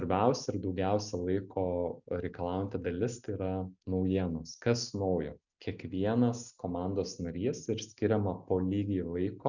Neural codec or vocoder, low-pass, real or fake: none; 7.2 kHz; real